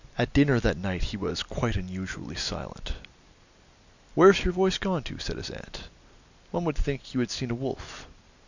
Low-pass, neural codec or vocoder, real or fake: 7.2 kHz; none; real